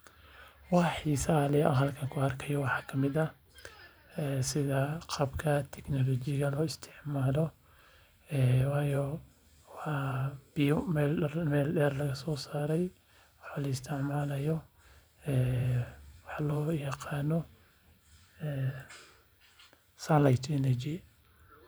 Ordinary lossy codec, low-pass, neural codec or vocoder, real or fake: none; none; vocoder, 44.1 kHz, 128 mel bands every 512 samples, BigVGAN v2; fake